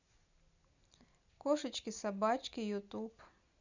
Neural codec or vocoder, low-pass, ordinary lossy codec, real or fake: none; 7.2 kHz; none; real